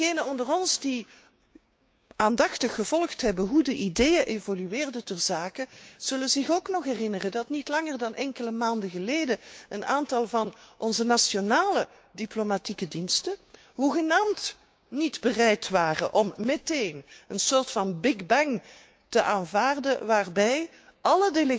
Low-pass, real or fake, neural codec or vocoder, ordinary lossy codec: none; fake; codec, 16 kHz, 6 kbps, DAC; none